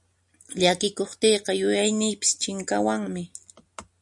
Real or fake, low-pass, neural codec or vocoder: real; 10.8 kHz; none